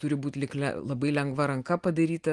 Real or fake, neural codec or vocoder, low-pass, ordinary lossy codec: real; none; 10.8 kHz; Opus, 24 kbps